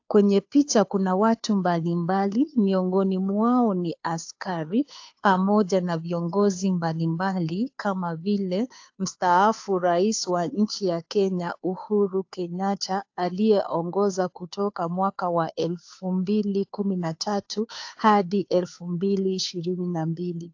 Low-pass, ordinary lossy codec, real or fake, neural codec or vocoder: 7.2 kHz; AAC, 48 kbps; fake; codec, 16 kHz, 2 kbps, FunCodec, trained on Chinese and English, 25 frames a second